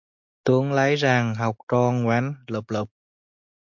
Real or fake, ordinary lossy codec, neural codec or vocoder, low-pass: real; MP3, 48 kbps; none; 7.2 kHz